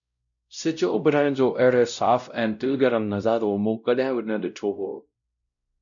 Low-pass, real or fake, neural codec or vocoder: 7.2 kHz; fake; codec, 16 kHz, 0.5 kbps, X-Codec, WavLM features, trained on Multilingual LibriSpeech